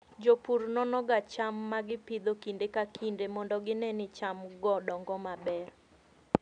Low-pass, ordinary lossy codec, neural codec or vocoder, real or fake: 9.9 kHz; none; none; real